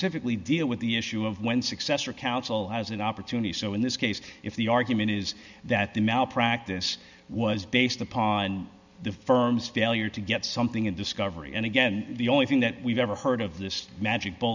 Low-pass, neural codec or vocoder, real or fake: 7.2 kHz; none; real